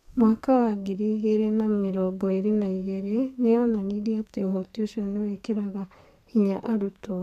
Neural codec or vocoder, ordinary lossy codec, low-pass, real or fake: codec, 32 kHz, 1.9 kbps, SNAC; none; 14.4 kHz; fake